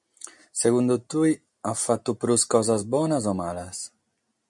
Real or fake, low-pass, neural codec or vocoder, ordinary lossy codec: real; 10.8 kHz; none; MP3, 64 kbps